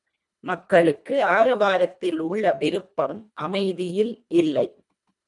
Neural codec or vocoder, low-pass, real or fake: codec, 24 kHz, 1.5 kbps, HILCodec; 10.8 kHz; fake